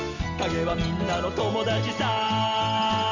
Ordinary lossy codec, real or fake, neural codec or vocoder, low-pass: none; real; none; 7.2 kHz